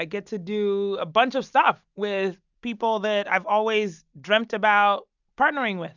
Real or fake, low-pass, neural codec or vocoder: real; 7.2 kHz; none